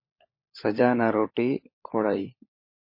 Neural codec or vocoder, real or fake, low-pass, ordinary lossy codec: codec, 16 kHz, 16 kbps, FunCodec, trained on LibriTTS, 50 frames a second; fake; 5.4 kHz; MP3, 24 kbps